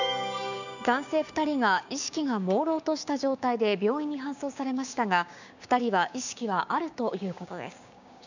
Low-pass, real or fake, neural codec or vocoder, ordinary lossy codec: 7.2 kHz; fake; codec, 16 kHz, 6 kbps, DAC; none